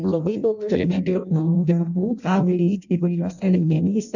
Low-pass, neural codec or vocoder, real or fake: 7.2 kHz; codec, 16 kHz in and 24 kHz out, 0.6 kbps, FireRedTTS-2 codec; fake